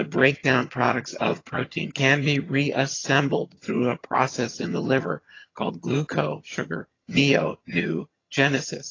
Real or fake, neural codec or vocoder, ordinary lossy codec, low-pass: fake; vocoder, 22.05 kHz, 80 mel bands, HiFi-GAN; AAC, 32 kbps; 7.2 kHz